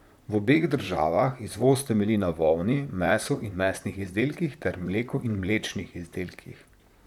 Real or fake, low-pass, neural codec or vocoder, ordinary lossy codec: fake; 19.8 kHz; vocoder, 44.1 kHz, 128 mel bands, Pupu-Vocoder; none